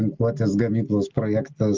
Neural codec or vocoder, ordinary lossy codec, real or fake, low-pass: none; Opus, 24 kbps; real; 7.2 kHz